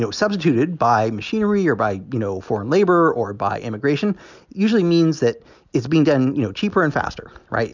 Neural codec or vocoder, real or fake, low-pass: none; real; 7.2 kHz